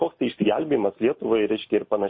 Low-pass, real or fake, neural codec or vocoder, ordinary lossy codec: 7.2 kHz; real; none; MP3, 24 kbps